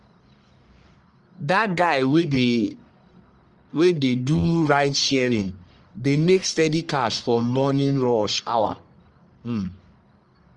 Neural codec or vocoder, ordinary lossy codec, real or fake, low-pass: codec, 44.1 kHz, 1.7 kbps, Pupu-Codec; Opus, 32 kbps; fake; 10.8 kHz